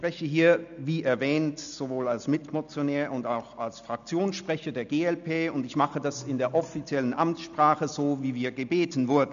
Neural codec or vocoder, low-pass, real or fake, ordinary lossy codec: none; 7.2 kHz; real; none